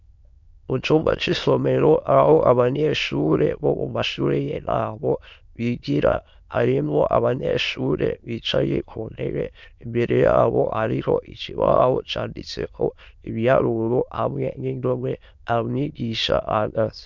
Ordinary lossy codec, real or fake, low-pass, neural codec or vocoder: MP3, 64 kbps; fake; 7.2 kHz; autoencoder, 22.05 kHz, a latent of 192 numbers a frame, VITS, trained on many speakers